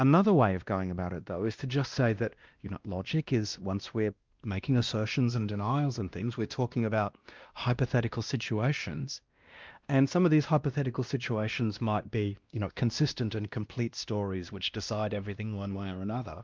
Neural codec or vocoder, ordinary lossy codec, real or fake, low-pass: codec, 16 kHz, 1 kbps, X-Codec, WavLM features, trained on Multilingual LibriSpeech; Opus, 32 kbps; fake; 7.2 kHz